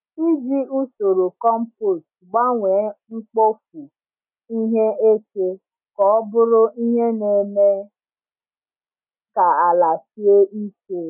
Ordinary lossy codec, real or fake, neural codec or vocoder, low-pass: none; real; none; 3.6 kHz